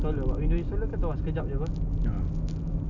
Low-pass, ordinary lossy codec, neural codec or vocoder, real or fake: 7.2 kHz; none; none; real